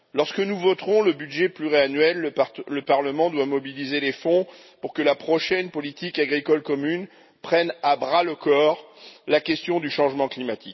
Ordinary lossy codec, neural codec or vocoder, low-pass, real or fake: MP3, 24 kbps; none; 7.2 kHz; real